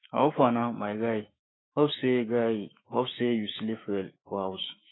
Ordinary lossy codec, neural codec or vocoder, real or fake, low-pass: AAC, 16 kbps; autoencoder, 48 kHz, 128 numbers a frame, DAC-VAE, trained on Japanese speech; fake; 7.2 kHz